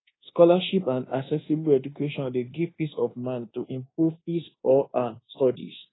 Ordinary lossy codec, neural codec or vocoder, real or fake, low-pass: AAC, 16 kbps; codec, 24 kHz, 1.2 kbps, DualCodec; fake; 7.2 kHz